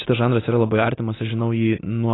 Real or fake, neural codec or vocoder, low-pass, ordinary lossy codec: real; none; 7.2 kHz; AAC, 16 kbps